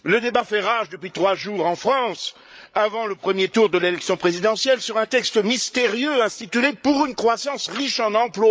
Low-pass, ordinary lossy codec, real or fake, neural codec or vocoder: none; none; fake; codec, 16 kHz, 8 kbps, FreqCodec, larger model